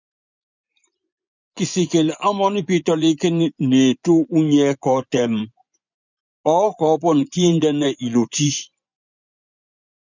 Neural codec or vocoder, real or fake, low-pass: vocoder, 24 kHz, 100 mel bands, Vocos; fake; 7.2 kHz